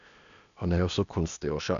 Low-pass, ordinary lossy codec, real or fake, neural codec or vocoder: 7.2 kHz; none; fake; codec, 16 kHz, 0.8 kbps, ZipCodec